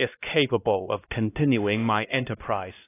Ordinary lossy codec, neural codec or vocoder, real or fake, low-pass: AAC, 24 kbps; codec, 16 kHz, 1 kbps, X-Codec, HuBERT features, trained on LibriSpeech; fake; 3.6 kHz